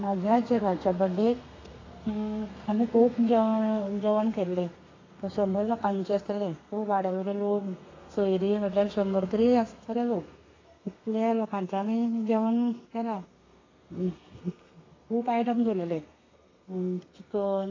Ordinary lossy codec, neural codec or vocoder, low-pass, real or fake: AAC, 32 kbps; codec, 32 kHz, 1.9 kbps, SNAC; 7.2 kHz; fake